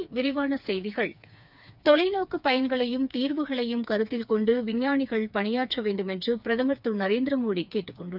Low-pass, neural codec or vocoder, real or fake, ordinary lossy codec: 5.4 kHz; codec, 16 kHz, 4 kbps, FreqCodec, smaller model; fake; none